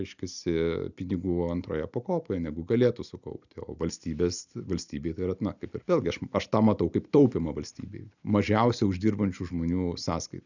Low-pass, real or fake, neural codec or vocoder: 7.2 kHz; real; none